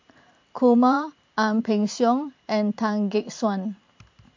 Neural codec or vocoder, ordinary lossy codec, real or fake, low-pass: vocoder, 44.1 kHz, 128 mel bands every 512 samples, BigVGAN v2; MP3, 48 kbps; fake; 7.2 kHz